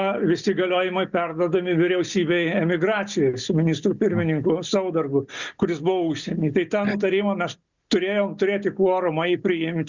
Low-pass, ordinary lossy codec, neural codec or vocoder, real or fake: 7.2 kHz; Opus, 64 kbps; none; real